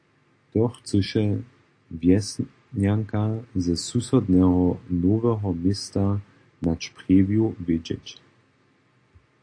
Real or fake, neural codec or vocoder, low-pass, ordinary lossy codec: real; none; 9.9 kHz; AAC, 48 kbps